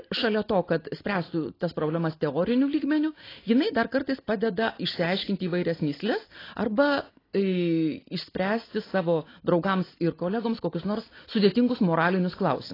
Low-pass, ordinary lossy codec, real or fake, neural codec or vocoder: 5.4 kHz; AAC, 24 kbps; real; none